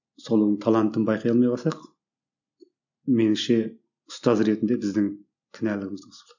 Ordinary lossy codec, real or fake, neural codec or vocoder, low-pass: none; real; none; 7.2 kHz